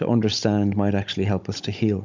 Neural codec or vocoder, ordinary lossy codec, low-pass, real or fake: codec, 16 kHz, 16 kbps, FunCodec, trained on Chinese and English, 50 frames a second; MP3, 64 kbps; 7.2 kHz; fake